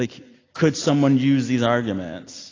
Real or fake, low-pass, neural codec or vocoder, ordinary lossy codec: real; 7.2 kHz; none; AAC, 32 kbps